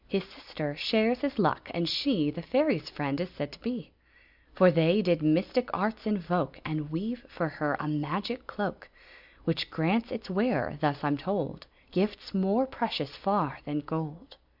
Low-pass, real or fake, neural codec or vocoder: 5.4 kHz; real; none